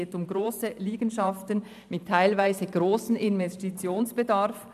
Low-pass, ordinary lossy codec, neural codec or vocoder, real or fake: 14.4 kHz; none; vocoder, 44.1 kHz, 128 mel bands every 512 samples, BigVGAN v2; fake